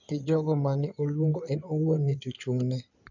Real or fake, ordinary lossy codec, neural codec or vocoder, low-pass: fake; none; codec, 16 kHz in and 24 kHz out, 2.2 kbps, FireRedTTS-2 codec; 7.2 kHz